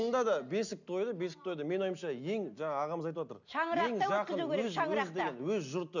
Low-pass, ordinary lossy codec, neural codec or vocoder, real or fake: 7.2 kHz; none; none; real